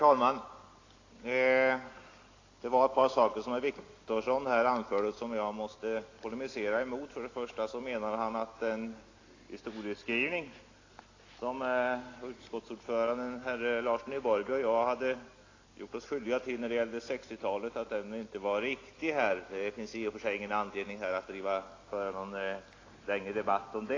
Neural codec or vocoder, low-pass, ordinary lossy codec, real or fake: none; 7.2 kHz; AAC, 32 kbps; real